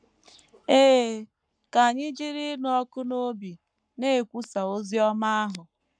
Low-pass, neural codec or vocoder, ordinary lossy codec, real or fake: 9.9 kHz; codec, 44.1 kHz, 7.8 kbps, Pupu-Codec; none; fake